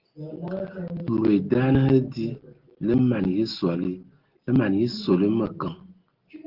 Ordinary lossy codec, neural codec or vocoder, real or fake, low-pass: Opus, 16 kbps; none; real; 5.4 kHz